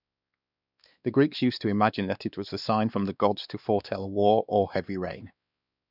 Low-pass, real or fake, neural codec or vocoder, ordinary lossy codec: 5.4 kHz; fake; codec, 16 kHz, 2 kbps, X-Codec, WavLM features, trained on Multilingual LibriSpeech; none